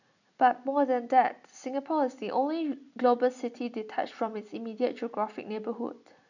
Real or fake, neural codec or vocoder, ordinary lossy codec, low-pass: real; none; none; 7.2 kHz